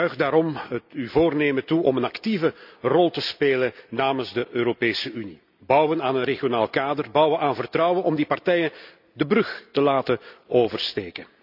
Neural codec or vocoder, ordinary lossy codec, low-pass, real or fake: none; none; 5.4 kHz; real